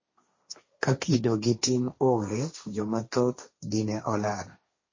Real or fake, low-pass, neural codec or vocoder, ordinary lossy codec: fake; 7.2 kHz; codec, 16 kHz, 1.1 kbps, Voila-Tokenizer; MP3, 32 kbps